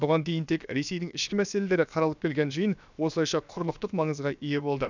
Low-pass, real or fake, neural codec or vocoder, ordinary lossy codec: 7.2 kHz; fake; codec, 16 kHz, about 1 kbps, DyCAST, with the encoder's durations; none